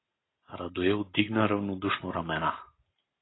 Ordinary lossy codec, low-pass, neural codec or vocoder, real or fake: AAC, 16 kbps; 7.2 kHz; none; real